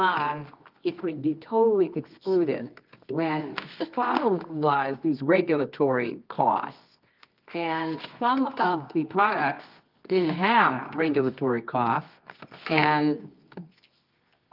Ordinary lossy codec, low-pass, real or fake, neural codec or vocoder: Opus, 24 kbps; 5.4 kHz; fake; codec, 24 kHz, 0.9 kbps, WavTokenizer, medium music audio release